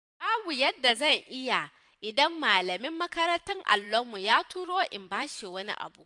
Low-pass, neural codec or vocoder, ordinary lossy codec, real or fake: 10.8 kHz; vocoder, 44.1 kHz, 128 mel bands every 512 samples, BigVGAN v2; AAC, 64 kbps; fake